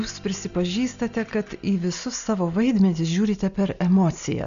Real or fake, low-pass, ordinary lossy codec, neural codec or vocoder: real; 7.2 kHz; AAC, 96 kbps; none